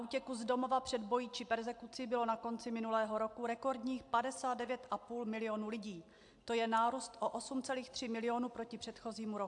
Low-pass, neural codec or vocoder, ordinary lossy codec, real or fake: 10.8 kHz; none; AAC, 64 kbps; real